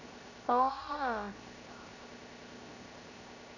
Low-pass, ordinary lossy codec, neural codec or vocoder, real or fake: 7.2 kHz; none; codec, 16 kHz, 0.7 kbps, FocalCodec; fake